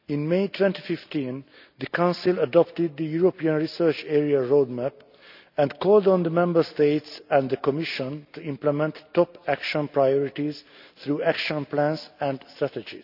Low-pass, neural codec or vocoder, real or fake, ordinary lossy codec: 5.4 kHz; none; real; none